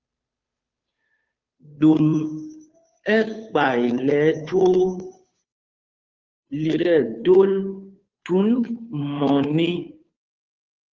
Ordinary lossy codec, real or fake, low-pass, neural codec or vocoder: Opus, 32 kbps; fake; 7.2 kHz; codec, 16 kHz, 2 kbps, FunCodec, trained on Chinese and English, 25 frames a second